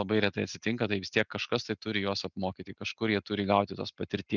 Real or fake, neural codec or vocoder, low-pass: real; none; 7.2 kHz